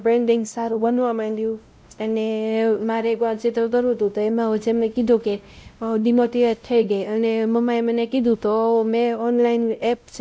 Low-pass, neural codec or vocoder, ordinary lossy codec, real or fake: none; codec, 16 kHz, 0.5 kbps, X-Codec, WavLM features, trained on Multilingual LibriSpeech; none; fake